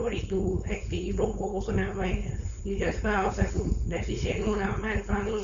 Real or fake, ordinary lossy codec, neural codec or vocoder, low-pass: fake; none; codec, 16 kHz, 4.8 kbps, FACodec; 7.2 kHz